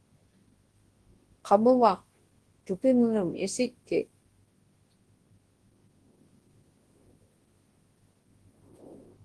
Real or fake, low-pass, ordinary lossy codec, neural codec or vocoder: fake; 10.8 kHz; Opus, 16 kbps; codec, 24 kHz, 0.9 kbps, WavTokenizer, large speech release